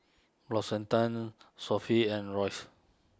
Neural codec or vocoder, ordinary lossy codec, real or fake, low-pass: none; none; real; none